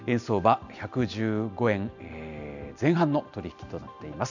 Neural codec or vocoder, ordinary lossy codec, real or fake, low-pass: none; none; real; 7.2 kHz